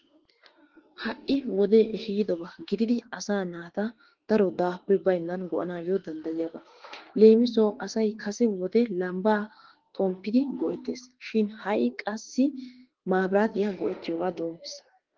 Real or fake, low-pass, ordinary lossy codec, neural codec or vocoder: fake; 7.2 kHz; Opus, 16 kbps; autoencoder, 48 kHz, 32 numbers a frame, DAC-VAE, trained on Japanese speech